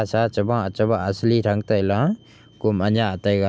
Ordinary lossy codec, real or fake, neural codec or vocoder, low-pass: none; real; none; none